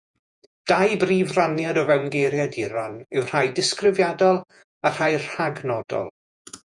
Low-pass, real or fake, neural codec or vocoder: 10.8 kHz; fake; vocoder, 48 kHz, 128 mel bands, Vocos